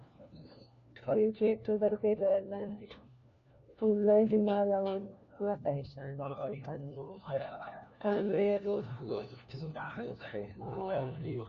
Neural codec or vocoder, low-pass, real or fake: codec, 16 kHz, 1 kbps, FunCodec, trained on LibriTTS, 50 frames a second; 7.2 kHz; fake